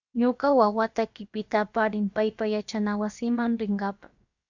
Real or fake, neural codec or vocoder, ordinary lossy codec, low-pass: fake; codec, 16 kHz, about 1 kbps, DyCAST, with the encoder's durations; Opus, 64 kbps; 7.2 kHz